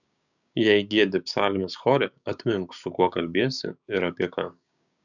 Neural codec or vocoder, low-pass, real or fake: codec, 16 kHz, 6 kbps, DAC; 7.2 kHz; fake